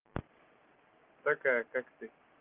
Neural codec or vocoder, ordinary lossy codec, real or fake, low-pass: none; Opus, 32 kbps; real; 3.6 kHz